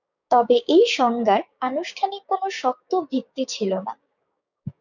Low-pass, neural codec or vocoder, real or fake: 7.2 kHz; codec, 16 kHz, 6 kbps, DAC; fake